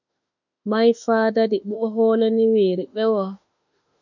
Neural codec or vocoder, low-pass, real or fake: autoencoder, 48 kHz, 32 numbers a frame, DAC-VAE, trained on Japanese speech; 7.2 kHz; fake